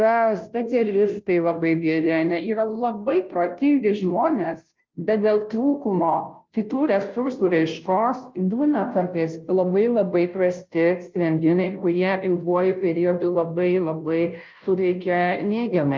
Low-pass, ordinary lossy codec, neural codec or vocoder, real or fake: 7.2 kHz; Opus, 24 kbps; codec, 16 kHz, 0.5 kbps, FunCodec, trained on Chinese and English, 25 frames a second; fake